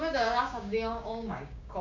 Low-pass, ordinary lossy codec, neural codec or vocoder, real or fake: 7.2 kHz; none; codec, 16 kHz, 6 kbps, DAC; fake